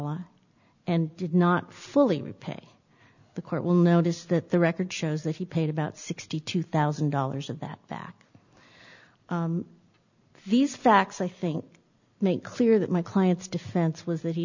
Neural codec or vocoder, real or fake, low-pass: none; real; 7.2 kHz